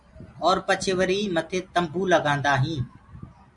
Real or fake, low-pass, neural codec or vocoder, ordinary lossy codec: real; 10.8 kHz; none; MP3, 96 kbps